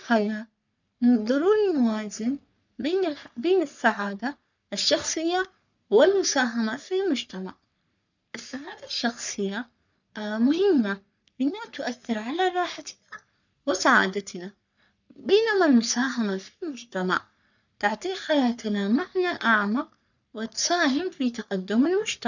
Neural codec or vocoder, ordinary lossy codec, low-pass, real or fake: codec, 44.1 kHz, 3.4 kbps, Pupu-Codec; none; 7.2 kHz; fake